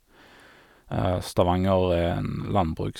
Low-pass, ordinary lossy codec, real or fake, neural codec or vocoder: 19.8 kHz; none; real; none